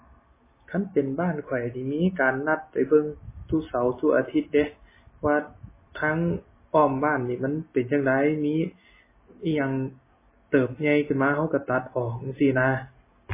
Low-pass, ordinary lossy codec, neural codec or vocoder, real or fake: 3.6 kHz; MP3, 16 kbps; none; real